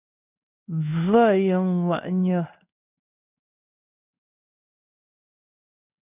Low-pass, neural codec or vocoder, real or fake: 3.6 kHz; codec, 16 kHz in and 24 kHz out, 1 kbps, XY-Tokenizer; fake